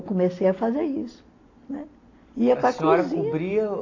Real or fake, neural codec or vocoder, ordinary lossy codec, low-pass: real; none; AAC, 32 kbps; 7.2 kHz